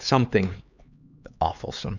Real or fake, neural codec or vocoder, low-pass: fake; codec, 16 kHz, 4 kbps, X-Codec, HuBERT features, trained on LibriSpeech; 7.2 kHz